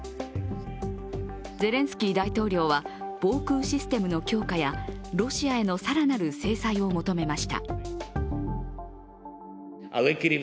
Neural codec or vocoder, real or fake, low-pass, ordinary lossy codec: none; real; none; none